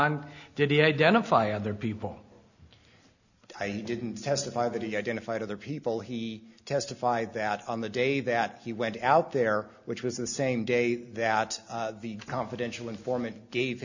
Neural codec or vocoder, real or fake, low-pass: none; real; 7.2 kHz